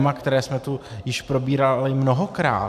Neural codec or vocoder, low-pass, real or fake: none; 14.4 kHz; real